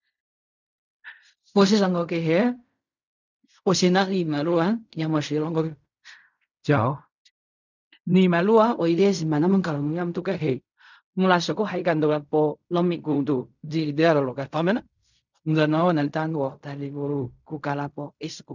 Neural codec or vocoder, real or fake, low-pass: codec, 16 kHz in and 24 kHz out, 0.4 kbps, LongCat-Audio-Codec, fine tuned four codebook decoder; fake; 7.2 kHz